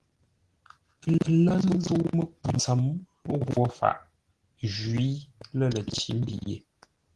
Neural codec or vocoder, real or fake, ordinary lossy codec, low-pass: none; real; Opus, 16 kbps; 10.8 kHz